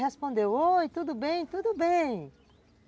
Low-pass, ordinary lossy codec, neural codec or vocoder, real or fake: none; none; none; real